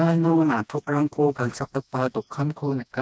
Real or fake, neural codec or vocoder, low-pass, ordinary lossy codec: fake; codec, 16 kHz, 1 kbps, FreqCodec, smaller model; none; none